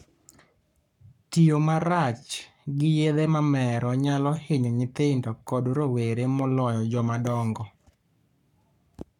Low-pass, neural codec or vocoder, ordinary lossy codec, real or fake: 19.8 kHz; codec, 44.1 kHz, 7.8 kbps, Pupu-Codec; none; fake